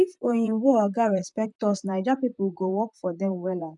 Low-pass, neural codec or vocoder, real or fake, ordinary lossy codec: 10.8 kHz; vocoder, 44.1 kHz, 128 mel bands, Pupu-Vocoder; fake; none